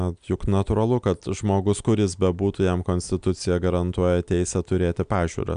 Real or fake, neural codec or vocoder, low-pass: real; none; 9.9 kHz